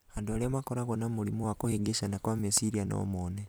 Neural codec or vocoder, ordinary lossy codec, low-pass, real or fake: vocoder, 44.1 kHz, 128 mel bands every 256 samples, BigVGAN v2; none; none; fake